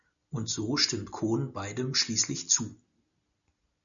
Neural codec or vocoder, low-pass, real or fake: none; 7.2 kHz; real